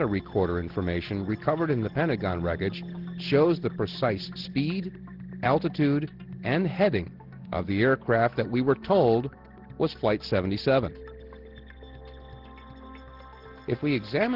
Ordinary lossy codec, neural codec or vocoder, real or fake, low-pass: Opus, 16 kbps; none; real; 5.4 kHz